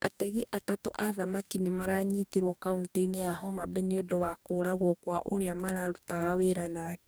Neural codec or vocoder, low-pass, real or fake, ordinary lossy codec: codec, 44.1 kHz, 2.6 kbps, DAC; none; fake; none